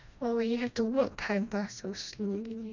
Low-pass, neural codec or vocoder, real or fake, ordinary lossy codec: 7.2 kHz; codec, 16 kHz, 1 kbps, FreqCodec, smaller model; fake; none